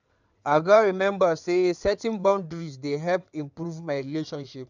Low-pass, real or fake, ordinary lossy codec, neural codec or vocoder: 7.2 kHz; fake; none; codec, 16 kHz in and 24 kHz out, 2.2 kbps, FireRedTTS-2 codec